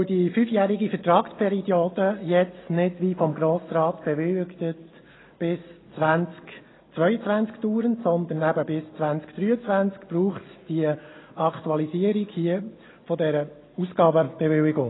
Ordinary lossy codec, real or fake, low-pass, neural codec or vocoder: AAC, 16 kbps; real; 7.2 kHz; none